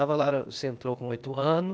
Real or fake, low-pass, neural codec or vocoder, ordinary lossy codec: fake; none; codec, 16 kHz, 0.8 kbps, ZipCodec; none